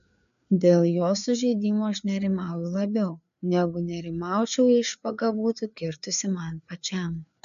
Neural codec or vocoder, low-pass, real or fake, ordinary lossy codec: codec, 16 kHz, 4 kbps, FreqCodec, larger model; 7.2 kHz; fake; AAC, 96 kbps